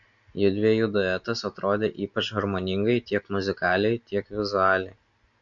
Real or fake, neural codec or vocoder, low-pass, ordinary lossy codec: real; none; 7.2 kHz; MP3, 48 kbps